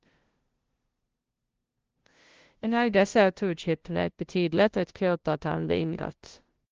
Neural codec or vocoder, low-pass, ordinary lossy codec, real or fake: codec, 16 kHz, 0.5 kbps, FunCodec, trained on LibriTTS, 25 frames a second; 7.2 kHz; Opus, 16 kbps; fake